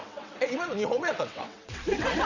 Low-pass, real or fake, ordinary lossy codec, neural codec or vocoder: 7.2 kHz; fake; none; vocoder, 22.05 kHz, 80 mel bands, WaveNeXt